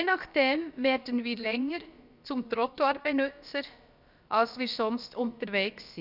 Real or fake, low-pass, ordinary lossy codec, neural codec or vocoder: fake; 5.4 kHz; none; codec, 16 kHz, about 1 kbps, DyCAST, with the encoder's durations